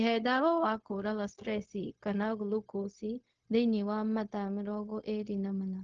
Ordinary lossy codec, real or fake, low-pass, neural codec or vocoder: Opus, 16 kbps; fake; 7.2 kHz; codec, 16 kHz, 0.4 kbps, LongCat-Audio-Codec